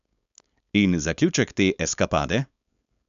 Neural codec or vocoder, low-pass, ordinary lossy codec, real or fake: codec, 16 kHz, 4.8 kbps, FACodec; 7.2 kHz; AAC, 96 kbps; fake